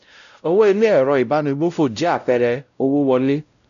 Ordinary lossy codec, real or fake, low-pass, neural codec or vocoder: none; fake; 7.2 kHz; codec, 16 kHz, 0.5 kbps, X-Codec, WavLM features, trained on Multilingual LibriSpeech